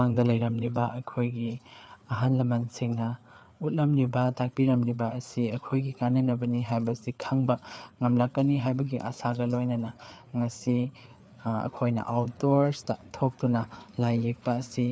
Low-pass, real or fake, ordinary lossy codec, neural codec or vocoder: none; fake; none; codec, 16 kHz, 4 kbps, FreqCodec, larger model